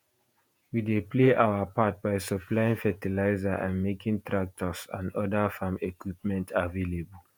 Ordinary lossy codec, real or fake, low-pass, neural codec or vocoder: none; fake; none; vocoder, 48 kHz, 128 mel bands, Vocos